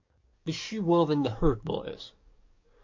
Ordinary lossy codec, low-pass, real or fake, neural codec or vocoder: MP3, 48 kbps; 7.2 kHz; fake; codec, 44.1 kHz, 7.8 kbps, DAC